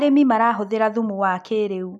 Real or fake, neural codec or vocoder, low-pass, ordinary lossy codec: real; none; none; none